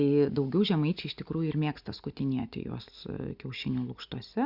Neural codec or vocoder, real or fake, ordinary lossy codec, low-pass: none; real; Opus, 64 kbps; 5.4 kHz